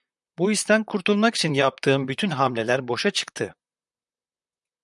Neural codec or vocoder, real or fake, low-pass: vocoder, 44.1 kHz, 128 mel bands, Pupu-Vocoder; fake; 10.8 kHz